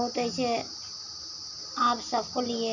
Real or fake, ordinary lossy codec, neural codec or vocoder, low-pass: real; none; none; 7.2 kHz